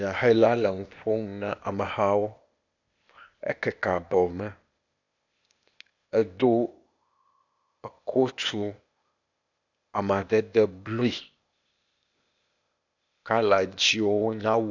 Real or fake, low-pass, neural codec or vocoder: fake; 7.2 kHz; codec, 16 kHz, 0.8 kbps, ZipCodec